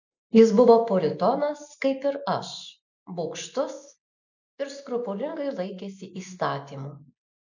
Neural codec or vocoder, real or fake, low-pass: none; real; 7.2 kHz